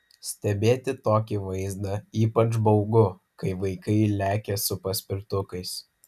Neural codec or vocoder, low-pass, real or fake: none; 14.4 kHz; real